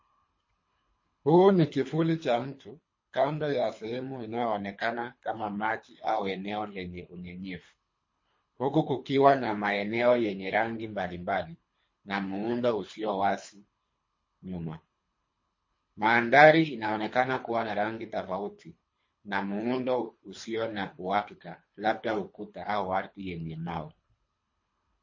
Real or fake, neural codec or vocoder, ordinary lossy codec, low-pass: fake; codec, 24 kHz, 3 kbps, HILCodec; MP3, 32 kbps; 7.2 kHz